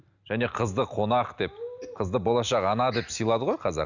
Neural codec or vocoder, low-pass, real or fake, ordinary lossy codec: none; 7.2 kHz; real; none